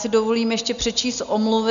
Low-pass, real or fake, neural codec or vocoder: 7.2 kHz; real; none